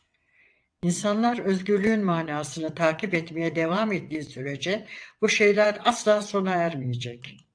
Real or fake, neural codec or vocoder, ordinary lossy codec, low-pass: fake; vocoder, 22.05 kHz, 80 mel bands, WaveNeXt; AAC, 64 kbps; 9.9 kHz